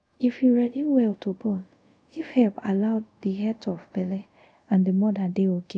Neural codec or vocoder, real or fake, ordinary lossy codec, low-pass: codec, 24 kHz, 0.5 kbps, DualCodec; fake; none; 9.9 kHz